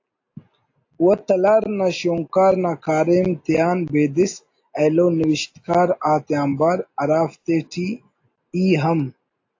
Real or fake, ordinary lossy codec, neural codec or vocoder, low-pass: real; AAC, 48 kbps; none; 7.2 kHz